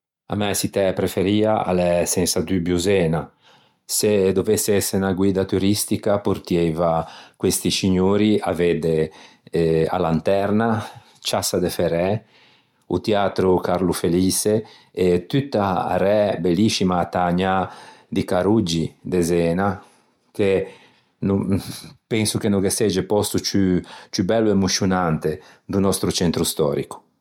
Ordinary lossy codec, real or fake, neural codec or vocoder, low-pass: MP3, 96 kbps; real; none; 19.8 kHz